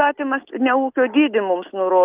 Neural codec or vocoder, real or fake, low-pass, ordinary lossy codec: none; real; 3.6 kHz; Opus, 32 kbps